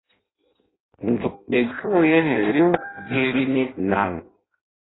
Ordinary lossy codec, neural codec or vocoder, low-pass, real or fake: AAC, 16 kbps; codec, 16 kHz in and 24 kHz out, 0.6 kbps, FireRedTTS-2 codec; 7.2 kHz; fake